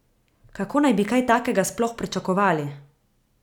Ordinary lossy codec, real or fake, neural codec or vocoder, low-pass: none; real; none; 19.8 kHz